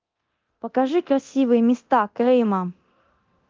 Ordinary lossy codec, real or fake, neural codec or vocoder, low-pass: Opus, 32 kbps; fake; codec, 24 kHz, 0.9 kbps, DualCodec; 7.2 kHz